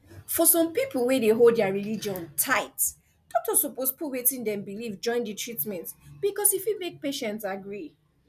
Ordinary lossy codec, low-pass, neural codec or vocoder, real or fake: none; 14.4 kHz; none; real